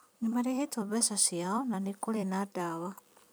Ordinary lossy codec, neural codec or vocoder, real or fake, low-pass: none; vocoder, 44.1 kHz, 128 mel bands every 256 samples, BigVGAN v2; fake; none